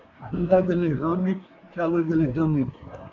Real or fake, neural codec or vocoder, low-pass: fake; codec, 24 kHz, 1 kbps, SNAC; 7.2 kHz